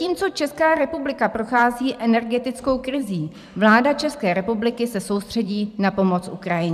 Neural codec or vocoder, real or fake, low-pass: vocoder, 44.1 kHz, 128 mel bands every 512 samples, BigVGAN v2; fake; 14.4 kHz